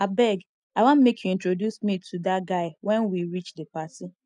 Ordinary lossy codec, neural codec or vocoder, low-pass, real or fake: none; none; 10.8 kHz; real